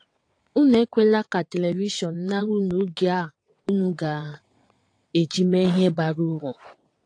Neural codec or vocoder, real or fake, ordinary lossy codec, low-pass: vocoder, 22.05 kHz, 80 mel bands, WaveNeXt; fake; AAC, 48 kbps; 9.9 kHz